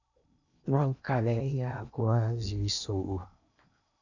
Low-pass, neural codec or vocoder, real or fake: 7.2 kHz; codec, 16 kHz in and 24 kHz out, 0.8 kbps, FocalCodec, streaming, 65536 codes; fake